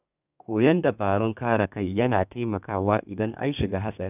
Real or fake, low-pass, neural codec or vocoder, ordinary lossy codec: fake; 3.6 kHz; codec, 32 kHz, 1.9 kbps, SNAC; none